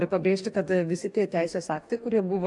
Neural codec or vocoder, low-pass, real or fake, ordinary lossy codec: codec, 44.1 kHz, 2.6 kbps, DAC; 10.8 kHz; fake; AAC, 64 kbps